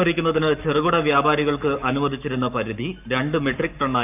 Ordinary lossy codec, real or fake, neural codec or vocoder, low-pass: none; fake; codec, 44.1 kHz, 7.8 kbps, Pupu-Codec; 3.6 kHz